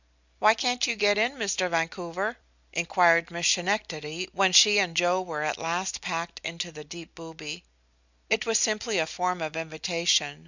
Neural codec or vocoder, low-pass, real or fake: none; 7.2 kHz; real